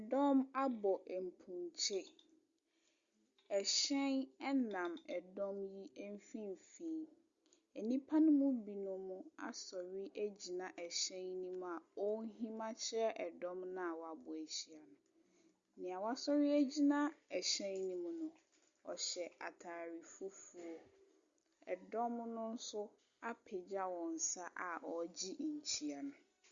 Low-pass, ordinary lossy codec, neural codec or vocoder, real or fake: 7.2 kHz; Opus, 64 kbps; none; real